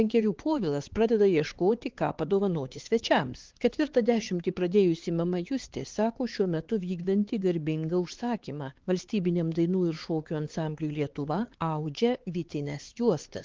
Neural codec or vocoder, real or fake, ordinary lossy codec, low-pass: codec, 16 kHz, 4 kbps, X-Codec, HuBERT features, trained on LibriSpeech; fake; Opus, 16 kbps; 7.2 kHz